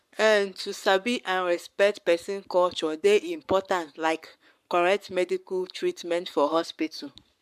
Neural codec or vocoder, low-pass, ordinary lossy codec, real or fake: codec, 44.1 kHz, 7.8 kbps, Pupu-Codec; 14.4 kHz; MP3, 96 kbps; fake